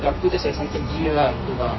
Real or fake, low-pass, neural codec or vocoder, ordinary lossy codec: fake; 7.2 kHz; codec, 32 kHz, 1.9 kbps, SNAC; MP3, 24 kbps